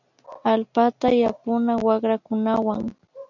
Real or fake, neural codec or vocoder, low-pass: real; none; 7.2 kHz